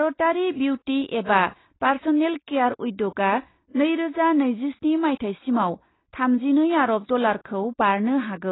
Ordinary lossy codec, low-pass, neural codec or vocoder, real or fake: AAC, 16 kbps; 7.2 kHz; none; real